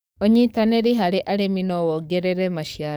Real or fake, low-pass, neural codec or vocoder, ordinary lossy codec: fake; none; codec, 44.1 kHz, 7.8 kbps, DAC; none